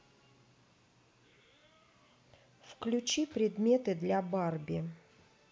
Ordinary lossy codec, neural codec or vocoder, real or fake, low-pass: none; none; real; none